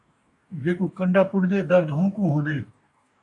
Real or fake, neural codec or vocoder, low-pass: fake; codec, 44.1 kHz, 2.6 kbps, DAC; 10.8 kHz